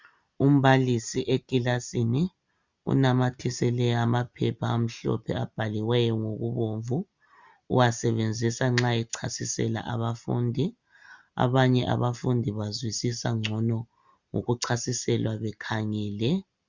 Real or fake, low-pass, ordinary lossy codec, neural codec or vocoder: real; 7.2 kHz; Opus, 64 kbps; none